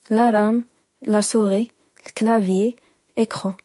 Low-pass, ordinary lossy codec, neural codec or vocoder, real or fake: 14.4 kHz; MP3, 48 kbps; autoencoder, 48 kHz, 32 numbers a frame, DAC-VAE, trained on Japanese speech; fake